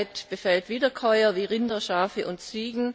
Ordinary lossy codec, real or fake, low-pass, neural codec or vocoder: none; real; none; none